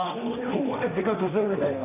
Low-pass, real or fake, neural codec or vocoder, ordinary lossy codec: 3.6 kHz; fake; codec, 16 kHz, 1.1 kbps, Voila-Tokenizer; none